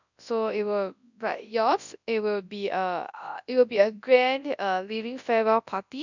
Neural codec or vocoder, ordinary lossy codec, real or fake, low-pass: codec, 24 kHz, 0.9 kbps, WavTokenizer, large speech release; none; fake; 7.2 kHz